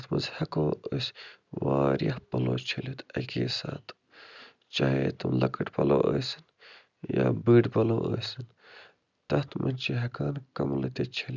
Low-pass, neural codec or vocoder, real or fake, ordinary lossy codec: 7.2 kHz; none; real; none